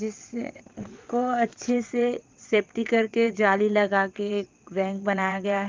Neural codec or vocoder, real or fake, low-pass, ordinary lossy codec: vocoder, 22.05 kHz, 80 mel bands, WaveNeXt; fake; 7.2 kHz; Opus, 32 kbps